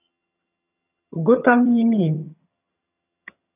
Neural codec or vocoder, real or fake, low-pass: vocoder, 22.05 kHz, 80 mel bands, HiFi-GAN; fake; 3.6 kHz